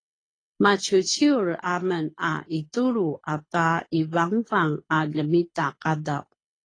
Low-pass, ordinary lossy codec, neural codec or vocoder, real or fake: 9.9 kHz; AAC, 32 kbps; codec, 24 kHz, 6 kbps, HILCodec; fake